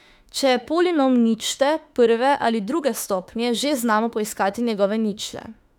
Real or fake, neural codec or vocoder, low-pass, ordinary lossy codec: fake; autoencoder, 48 kHz, 32 numbers a frame, DAC-VAE, trained on Japanese speech; 19.8 kHz; none